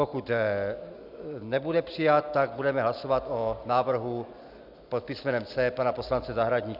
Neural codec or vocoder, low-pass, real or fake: none; 5.4 kHz; real